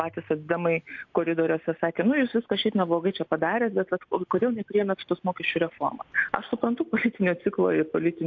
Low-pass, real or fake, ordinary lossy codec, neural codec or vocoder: 7.2 kHz; real; AAC, 48 kbps; none